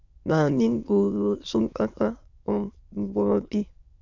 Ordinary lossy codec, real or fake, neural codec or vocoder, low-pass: Opus, 64 kbps; fake; autoencoder, 22.05 kHz, a latent of 192 numbers a frame, VITS, trained on many speakers; 7.2 kHz